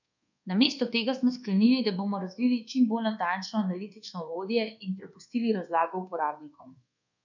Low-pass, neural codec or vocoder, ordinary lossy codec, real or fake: 7.2 kHz; codec, 24 kHz, 1.2 kbps, DualCodec; none; fake